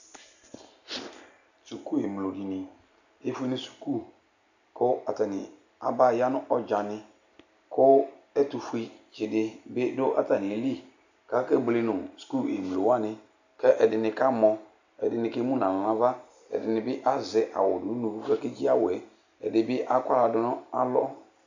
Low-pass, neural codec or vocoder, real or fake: 7.2 kHz; none; real